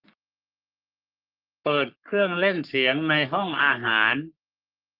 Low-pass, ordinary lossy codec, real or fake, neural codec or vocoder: 5.4 kHz; Opus, 32 kbps; fake; codec, 44.1 kHz, 3.4 kbps, Pupu-Codec